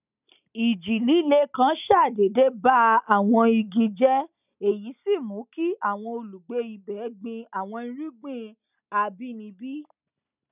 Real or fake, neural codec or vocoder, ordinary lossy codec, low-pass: real; none; none; 3.6 kHz